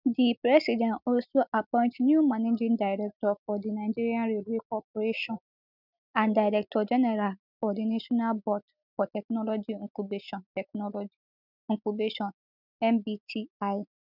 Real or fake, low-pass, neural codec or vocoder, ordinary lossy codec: real; 5.4 kHz; none; none